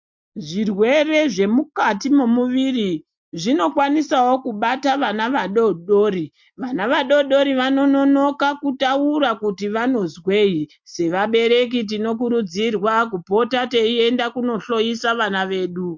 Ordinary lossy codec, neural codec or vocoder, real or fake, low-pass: MP3, 48 kbps; none; real; 7.2 kHz